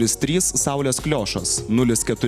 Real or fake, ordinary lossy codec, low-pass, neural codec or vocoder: real; Opus, 64 kbps; 14.4 kHz; none